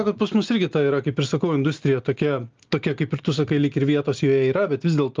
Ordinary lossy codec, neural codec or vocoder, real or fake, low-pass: Opus, 24 kbps; none; real; 7.2 kHz